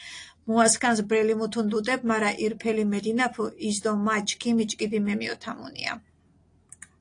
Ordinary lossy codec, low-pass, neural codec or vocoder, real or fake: AAC, 48 kbps; 9.9 kHz; none; real